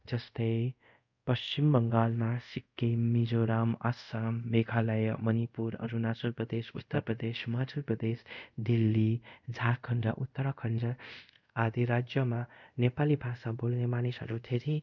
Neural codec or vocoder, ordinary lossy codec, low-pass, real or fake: codec, 24 kHz, 0.5 kbps, DualCodec; none; 7.2 kHz; fake